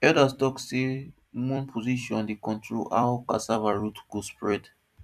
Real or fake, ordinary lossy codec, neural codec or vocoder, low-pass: fake; none; vocoder, 44.1 kHz, 128 mel bands every 256 samples, BigVGAN v2; 14.4 kHz